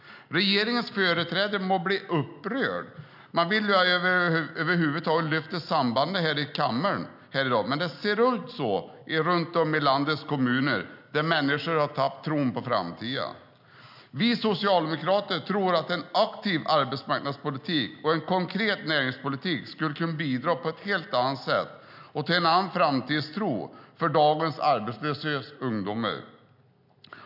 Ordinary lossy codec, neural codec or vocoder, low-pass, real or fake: none; none; 5.4 kHz; real